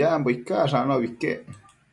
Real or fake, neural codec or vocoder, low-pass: real; none; 10.8 kHz